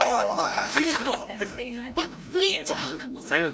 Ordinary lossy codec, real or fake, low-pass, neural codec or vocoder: none; fake; none; codec, 16 kHz, 0.5 kbps, FreqCodec, larger model